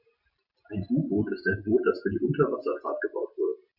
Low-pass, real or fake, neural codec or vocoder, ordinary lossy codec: 5.4 kHz; real; none; Opus, 64 kbps